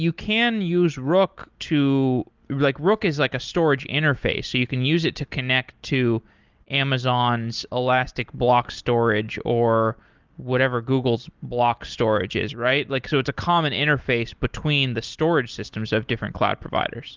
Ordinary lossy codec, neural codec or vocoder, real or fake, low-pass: Opus, 32 kbps; none; real; 7.2 kHz